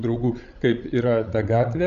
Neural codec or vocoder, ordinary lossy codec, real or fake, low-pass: codec, 16 kHz, 16 kbps, FreqCodec, larger model; AAC, 64 kbps; fake; 7.2 kHz